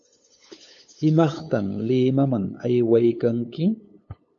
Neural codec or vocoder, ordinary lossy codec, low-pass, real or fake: codec, 16 kHz, 8 kbps, FunCodec, trained on LibriTTS, 25 frames a second; MP3, 48 kbps; 7.2 kHz; fake